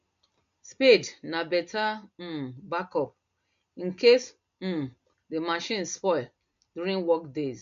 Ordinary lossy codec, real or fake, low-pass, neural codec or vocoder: MP3, 48 kbps; real; 7.2 kHz; none